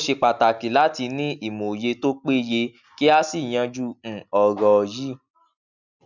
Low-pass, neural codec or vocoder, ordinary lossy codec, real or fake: 7.2 kHz; none; none; real